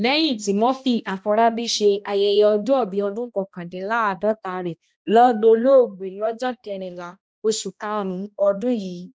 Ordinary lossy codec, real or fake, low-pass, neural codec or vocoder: none; fake; none; codec, 16 kHz, 1 kbps, X-Codec, HuBERT features, trained on balanced general audio